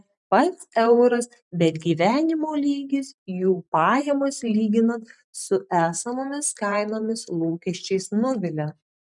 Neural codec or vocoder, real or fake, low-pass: vocoder, 44.1 kHz, 128 mel bands every 512 samples, BigVGAN v2; fake; 10.8 kHz